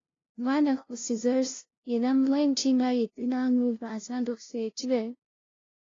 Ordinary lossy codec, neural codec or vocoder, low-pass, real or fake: AAC, 32 kbps; codec, 16 kHz, 0.5 kbps, FunCodec, trained on LibriTTS, 25 frames a second; 7.2 kHz; fake